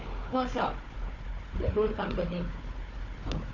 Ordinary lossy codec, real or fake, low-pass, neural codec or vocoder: none; fake; 7.2 kHz; codec, 16 kHz, 4 kbps, FunCodec, trained on Chinese and English, 50 frames a second